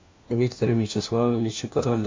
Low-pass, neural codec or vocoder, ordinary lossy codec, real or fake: 7.2 kHz; codec, 16 kHz, 1 kbps, FunCodec, trained on LibriTTS, 50 frames a second; MP3, 48 kbps; fake